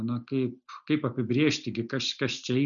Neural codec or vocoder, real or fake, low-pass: none; real; 7.2 kHz